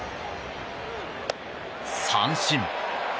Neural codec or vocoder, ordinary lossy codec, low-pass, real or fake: none; none; none; real